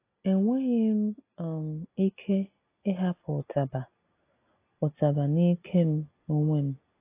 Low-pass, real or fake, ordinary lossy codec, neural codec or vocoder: 3.6 kHz; real; AAC, 24 kbps; none